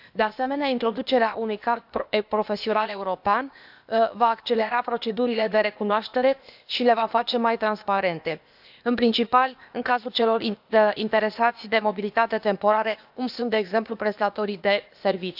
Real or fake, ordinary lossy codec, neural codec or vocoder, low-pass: fake; none; codec, 16 kHz, 0.8 kbps, ZipCodec; 5.4 kHz